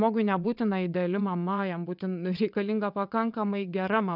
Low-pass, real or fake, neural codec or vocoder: 5.4 kHz; fake; vocoder, 22.05 kHz, 80 mel bands, WaveNeXt